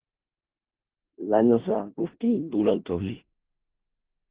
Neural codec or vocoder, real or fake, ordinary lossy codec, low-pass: codec, 16 kHz in and 24 kHz out, 0.4 kbps, LongCat-Audio-Codec, four codebook decoder; fake; Opus, 16 kbps; 3.6 kHz